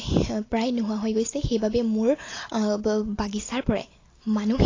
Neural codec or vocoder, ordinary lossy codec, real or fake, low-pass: none; AAC, 32 kbps; real; 7.2 kHz